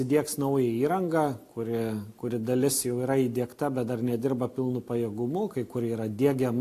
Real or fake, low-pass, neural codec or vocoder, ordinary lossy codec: real; 14.4 kHz; none; AAC, 64 kbps